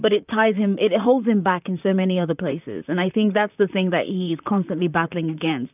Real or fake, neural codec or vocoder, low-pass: fake; vocoder, 44.1 kHz, 128 mel bands, Pupu-Vocoder; 3.6 kHz